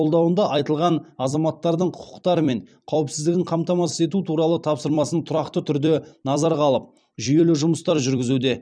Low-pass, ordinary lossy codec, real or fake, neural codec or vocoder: 9.9 kHz; none; fake; vocoder, 44.1 kHz, 128 mel bands every 256 samples, BigVGAN v2